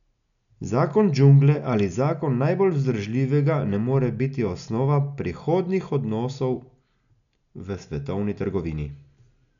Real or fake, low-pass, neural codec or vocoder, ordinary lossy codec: real; 7.2 kHz; none; none